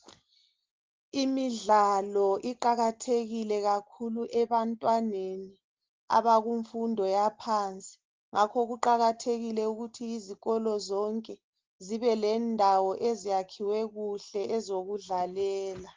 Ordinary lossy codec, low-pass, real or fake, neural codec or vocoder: Opus, 16 kbps; 7.2 kHz; real; none